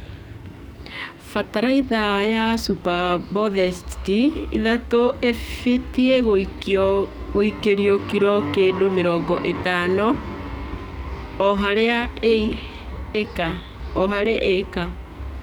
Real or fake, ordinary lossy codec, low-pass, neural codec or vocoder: fake; none; none; codec, 44.1 kHz, 2.6 kbps, SNAC